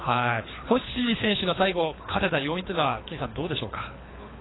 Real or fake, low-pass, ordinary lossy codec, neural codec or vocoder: fake; 7.2 kHz; AAC, 16 kbps; codec, 24 kHz, 3 kbps, HILCodec